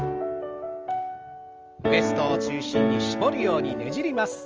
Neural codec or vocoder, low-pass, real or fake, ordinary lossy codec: none; 7.2 kHz; real; Opus, 24 kbps